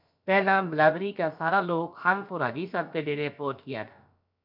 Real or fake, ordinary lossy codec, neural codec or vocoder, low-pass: fake; MP3, 48 kbps; codec, 16 kHz, about 1 kbps, DyCAST, with the encoder's durations; 5.4 kHz